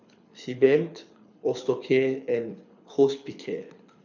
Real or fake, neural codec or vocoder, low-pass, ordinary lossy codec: fake; codec, 24 kHz, 6 kbps, HILCodec; 7.2 kHz; none